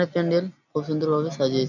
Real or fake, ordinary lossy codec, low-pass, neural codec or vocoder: real; none; 7.2 kHz; none